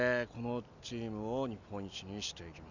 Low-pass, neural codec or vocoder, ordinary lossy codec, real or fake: 7.2 kHz; none; none; real